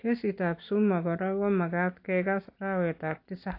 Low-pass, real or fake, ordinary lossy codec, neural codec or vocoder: 5.4 kHz; real; AAC, 32 kbps; none